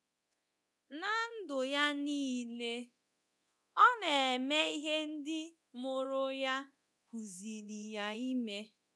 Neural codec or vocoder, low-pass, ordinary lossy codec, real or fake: codec, 24 kHz, 0.9 kbps, DualCodec; none; none; fake